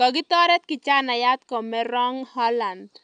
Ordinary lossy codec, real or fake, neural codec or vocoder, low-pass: none; real; none; 9.9 kHz